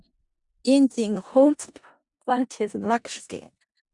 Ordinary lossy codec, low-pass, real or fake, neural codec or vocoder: Opus, 64 kbps; 10.8 kHz; fake; codec, 16 kHz in and 24 kHz out, 0.4 kbps, LongCat-Audio-Codec, four codebook decoder